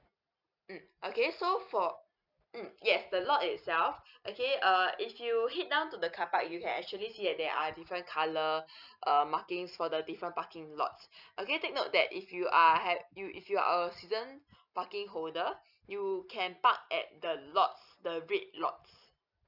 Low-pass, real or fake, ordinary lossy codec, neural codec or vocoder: 5.4 kHz; real; none; none